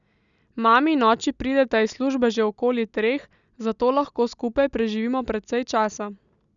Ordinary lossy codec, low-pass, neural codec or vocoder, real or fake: none; 7.2 kHz; none; real